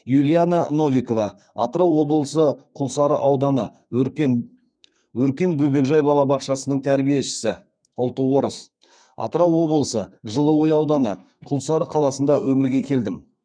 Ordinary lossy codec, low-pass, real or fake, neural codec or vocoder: none; 9.9 kHz; fake; codec, 32 kHz, 1.9 kbps, SNAC